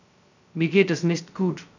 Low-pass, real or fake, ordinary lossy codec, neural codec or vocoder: 7.2 kHz; fake; none; codec, 16 kHz, 0.2 kbps, FocalCodec